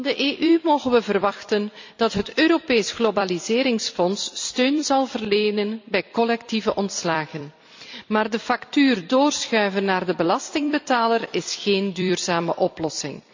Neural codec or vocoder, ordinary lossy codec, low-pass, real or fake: vocoder, 44.1 kHz, 128 mel bands every 256 samples, BigVGAN v2; MP3, 64 kbps; 7.2 kHz; fake